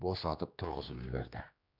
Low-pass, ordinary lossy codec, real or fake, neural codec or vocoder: 5.4 kHz; none; fake; codec, 16 kHz, 2 kbps, X-Codec, HuBERT features, trained on general audio